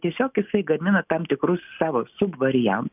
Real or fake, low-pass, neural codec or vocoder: real; 3.6 kHz; none